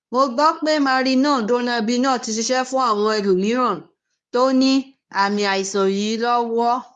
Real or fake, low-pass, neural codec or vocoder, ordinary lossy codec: fake; none; codec, 24 kHz, 0.9 kbps, WavTokenizer, medium speech release version 2; none